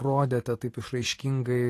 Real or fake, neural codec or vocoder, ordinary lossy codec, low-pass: fake; vocoder, 44.1 kHz, 128 mel bands every 512 samples, BigVGAN v2; AAC, 48 kbps; 14.4 kHz